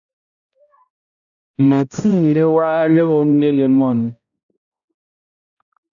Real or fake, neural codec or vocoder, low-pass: fake; codec, 16 kHz, 0.5 kbps, X-Codec, HuBERT features, trained on balanced general audio; 7.2 kHz